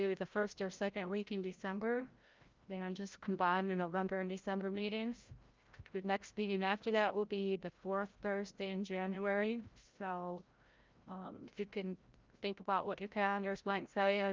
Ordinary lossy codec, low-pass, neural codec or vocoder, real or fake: Opus, 24 kbps; 7.2 kHz; codec, 16 kHz, 0.5 kbps, FreqCodec, larger model; fake